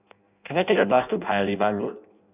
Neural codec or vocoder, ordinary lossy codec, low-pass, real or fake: codec, 16 kHz in and 24 kHz out, 0.6 kbps, FireRedTTS-2 codec; none; 3.6 kHz; fake